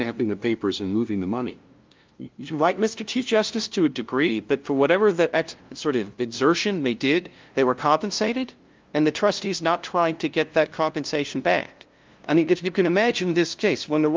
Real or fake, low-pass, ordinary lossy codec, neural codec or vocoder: fake; 7.2 kHz; Opus, 32 kbps; codec, 16 kHz, 0.5 kbps, FunCodec, trained on LibriTTS, 25 frames a second